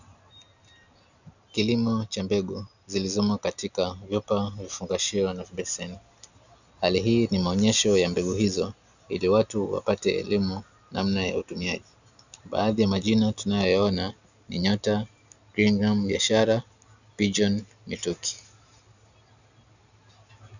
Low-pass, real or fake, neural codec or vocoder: 7.2 kHz; real; none